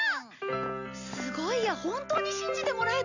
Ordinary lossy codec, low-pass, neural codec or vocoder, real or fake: none; 7.2 kHz; none; real